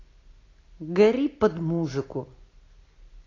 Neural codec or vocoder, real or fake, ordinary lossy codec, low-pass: none; real; AAC, 32 kbps; 7.2 kHz